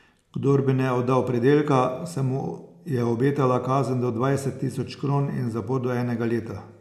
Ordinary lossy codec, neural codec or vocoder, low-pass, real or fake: none; none; 14.4 kHz; real